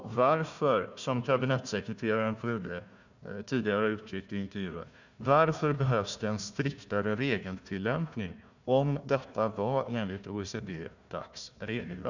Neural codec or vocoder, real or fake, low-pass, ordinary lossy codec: codec, 16 kHz, 1 kbps, FunCodec, trained on Chinese and English, 50 frames a second; fake; 7.2 kHz; none